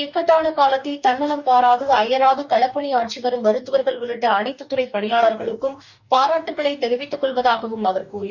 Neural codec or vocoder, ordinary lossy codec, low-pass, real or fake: codec, 44.1 kHz, 2.6 kbps, DAC; none; 7.2 kHz; fake